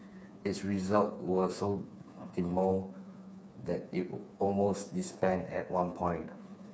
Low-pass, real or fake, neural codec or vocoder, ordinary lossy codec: none; fake; codec, 16 kHz, 4 kbps, FreqCodec, smaller model; none